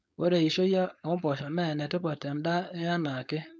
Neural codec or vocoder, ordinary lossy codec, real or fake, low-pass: codec, 16 kHz, 4.8 kbps, FACodec; none; fake; none